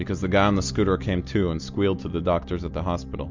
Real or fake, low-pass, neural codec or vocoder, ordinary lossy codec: real; 7.2 kHz; none; MP3, 64 kbps